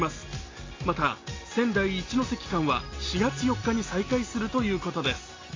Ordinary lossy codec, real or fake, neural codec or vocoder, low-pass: AAC, 32 kbps; real; none; 7.2 kHz